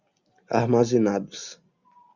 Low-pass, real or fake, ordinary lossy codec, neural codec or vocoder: 7.2 kHz; real; Opus, 64 kbps; none